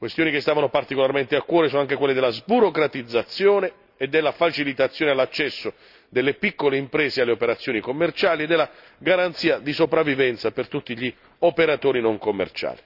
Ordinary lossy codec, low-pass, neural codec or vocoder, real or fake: none; 5.4 kHz; none; real